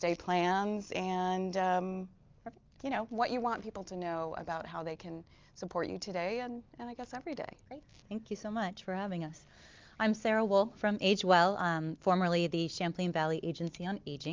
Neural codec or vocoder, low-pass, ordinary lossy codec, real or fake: none; 7.2 kHz; Opus, 24 kbps; real